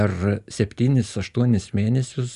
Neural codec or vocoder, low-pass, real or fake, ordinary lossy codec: none; 10.8 kHz; real; Opus, 64 kbps